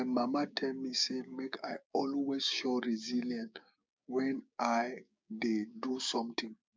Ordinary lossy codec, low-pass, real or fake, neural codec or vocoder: none; none; fake; codec, 16 kHz, 6 kbps, DAC